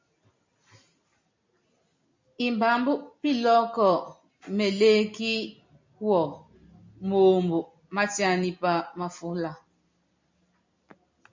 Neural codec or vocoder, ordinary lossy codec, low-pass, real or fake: none; MP3, 64 kbps; 7.2 kHz; real